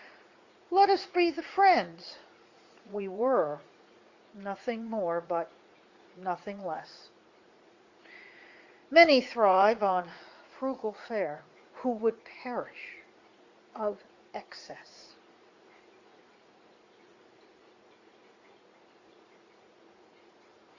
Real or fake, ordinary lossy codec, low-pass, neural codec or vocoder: fake; Opus, 64 kbps; 7.2 kHz; vocoder, 44.1 kHz, 80 mel bands, Vocos